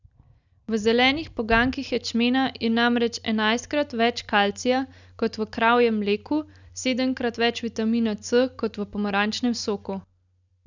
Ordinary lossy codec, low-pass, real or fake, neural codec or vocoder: none; 7.2 kHz; real; none